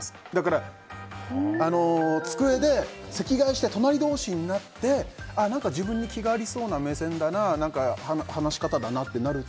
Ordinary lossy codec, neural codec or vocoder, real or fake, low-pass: none; none; real; none